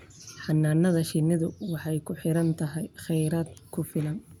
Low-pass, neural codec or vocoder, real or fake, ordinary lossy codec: 19.8 kHz; none; real; none